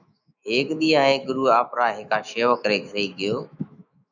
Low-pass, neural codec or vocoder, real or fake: 7.2 kHz; autoencoder, 48 kHz, 128 numbers a frame, DAC-VAE, trained on Japanese speech; fake